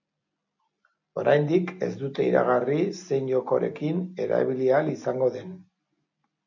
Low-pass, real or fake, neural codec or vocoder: 7.2 kHz; real; none